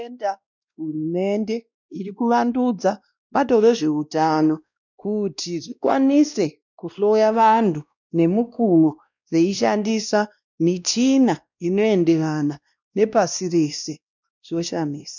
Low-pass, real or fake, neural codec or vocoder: 7.2 kHz; fake; codec, 16 kHz, 1 kbps, X-Codec, WavLM features, trained on Multilingual LibriSpeech